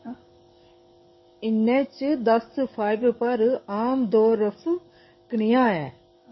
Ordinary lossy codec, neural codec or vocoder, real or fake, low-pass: MP3, 24 kbps; codec, 44.1 kHz, 7.8 kbps, DAC; fake; 7.2 kHz